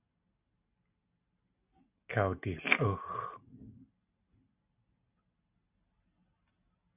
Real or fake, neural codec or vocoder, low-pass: real; none; 3.6 kHz